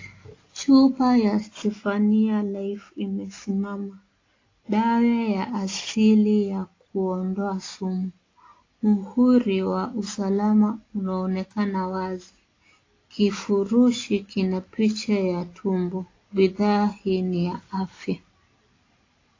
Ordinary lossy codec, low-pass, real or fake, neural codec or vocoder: AAC, 32 kbps; 7.2 kHz; real; none